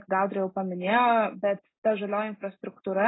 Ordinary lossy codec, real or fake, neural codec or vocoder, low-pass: AAC, 16 kbps; real; none; 7.2 kHz